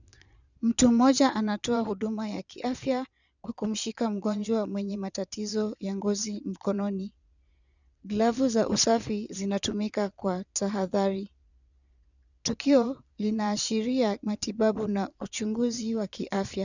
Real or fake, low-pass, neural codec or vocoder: fake; 7.2 kHz; vocoder, 22.05 kHz, 80 mel bands, Vocos